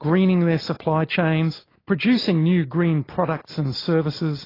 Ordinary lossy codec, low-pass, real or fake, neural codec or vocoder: AAC, 24 kbps; 5.4 kHz; real; none